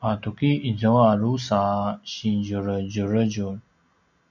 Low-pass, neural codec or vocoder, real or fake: 7.2 kHz; none; real